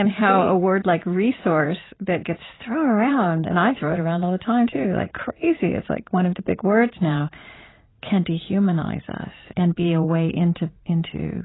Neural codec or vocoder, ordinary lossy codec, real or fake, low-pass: vocoder, 44.1 kHz, 80 mel bands, Vocos; AAC, 16 kbps; fake; 7.2 kHz